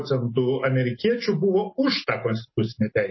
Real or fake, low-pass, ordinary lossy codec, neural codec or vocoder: real; 7.2 kHz; MP3, 24 kbps; none